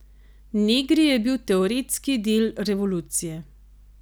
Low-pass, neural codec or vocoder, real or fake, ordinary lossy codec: none; none; real; none